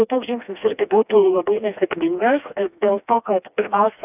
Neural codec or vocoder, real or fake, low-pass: codec, 16 kHz, 1 kbps, FreqCodec, smaller model; fake; 3.6 kHz